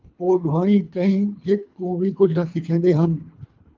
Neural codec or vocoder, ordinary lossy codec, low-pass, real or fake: codec, 24 kHz, 3 kbps, HILCodec; Opus, 32 kbps; 7.2 kHz; fake